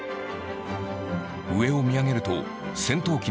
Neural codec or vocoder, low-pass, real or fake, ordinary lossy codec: none; none; real; none